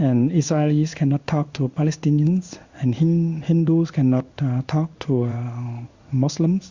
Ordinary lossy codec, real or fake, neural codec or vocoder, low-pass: Opus, 64 kbps; fake; codec, 16 kHz in and 24 kHz out, 1 kbps, XY-Tokenizer; 7.2 kHz